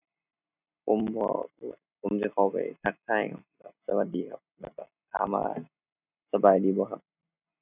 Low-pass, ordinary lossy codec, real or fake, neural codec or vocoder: 3.6 kHz; none; real; none